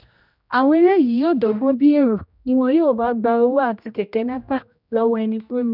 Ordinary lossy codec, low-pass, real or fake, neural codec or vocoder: none; 5.4 kHz; fake; codec, 16 kHz, 1 kbps, X-Codec, HuBERT features, trained on general audio